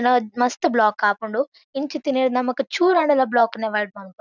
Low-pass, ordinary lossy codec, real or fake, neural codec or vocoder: 7.2 kHz; none; real; none